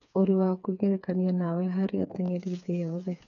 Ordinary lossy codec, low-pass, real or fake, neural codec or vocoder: none; 7.2 kHz; fake; codec, 16 kHz, 4 kbps, FreqCodec, smaller model